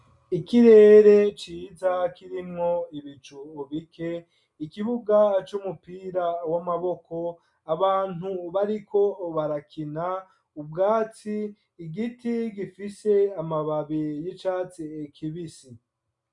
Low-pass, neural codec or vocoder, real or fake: 10.8 kHz; none; real